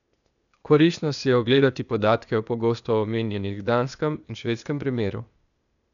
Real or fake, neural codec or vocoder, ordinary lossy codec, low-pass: fake; codec, 16 kHz, 0.8 kbps, ZipCodec; none; 7.2 kHz